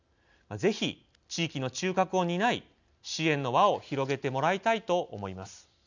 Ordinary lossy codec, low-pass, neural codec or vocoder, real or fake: none; 7.2 kHz; none; real